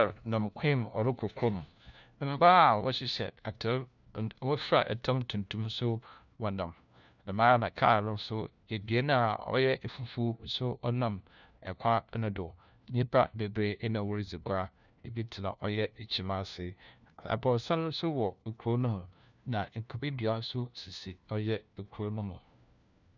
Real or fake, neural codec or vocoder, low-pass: fake; codec, 16 kHz, 1 kbps, FunCodec, trained on LibriTTS, 50 frames a second; 7.2 kHz